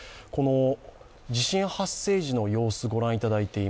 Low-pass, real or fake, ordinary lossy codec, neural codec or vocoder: none; real; none; none